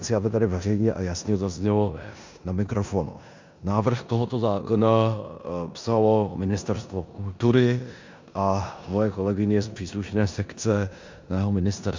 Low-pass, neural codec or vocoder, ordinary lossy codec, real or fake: 7.2 kHz; codec, 16 kHz in and 24 kHz out, 0.9 kbps, LongCat-Audio-Codec, four codebook decoder; MP3, 64 kbps; fake